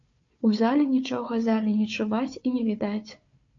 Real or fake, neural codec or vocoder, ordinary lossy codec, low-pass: fake; codec, 16 kHz, 4 kbps, FunCodec, trained on Chinese and English, 50 frames a second; AAC, 48 kbps; 7.2 kHz